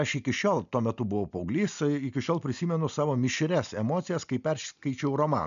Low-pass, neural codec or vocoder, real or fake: 7.2 kHz; none; real